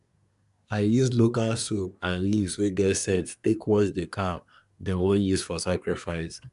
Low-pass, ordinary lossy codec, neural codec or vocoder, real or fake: 10.8 kHz; none; codec, 24 kHz, 1 kbps, SNAC; fake